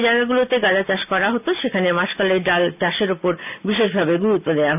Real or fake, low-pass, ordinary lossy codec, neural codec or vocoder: real; 3.6 kHz; none; none